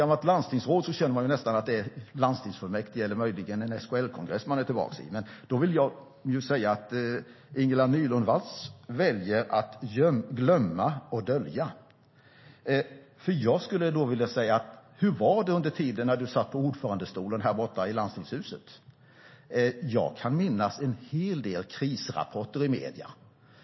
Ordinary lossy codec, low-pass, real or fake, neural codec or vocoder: MP3, 24 kbps; 7.2 kHz; real; none